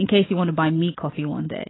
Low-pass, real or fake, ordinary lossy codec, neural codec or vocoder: 7.2 kHz; real; AAC, 16 kbps; none